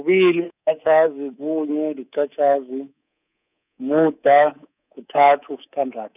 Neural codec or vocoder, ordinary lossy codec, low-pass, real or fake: codec, 24 kHz, 3.1 kbps, DualCodec; none; 3.6 kHz; fake